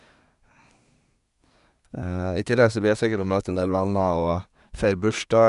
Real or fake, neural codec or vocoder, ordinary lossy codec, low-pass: fake; codec, 24 kHz, 1 kbps, SNAC; none; 10.8 kHz